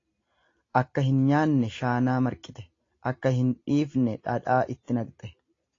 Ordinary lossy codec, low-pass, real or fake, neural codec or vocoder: AAC, 32 kbps; 7.2 kHz; real; none